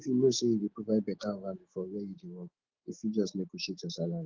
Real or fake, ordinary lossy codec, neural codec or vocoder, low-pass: fake; Opus, 16 kbps; autoencoder, 48 kHz, 128 numbers a frame, DAC-VAE, trained on Japanese speech; 7.2 kHz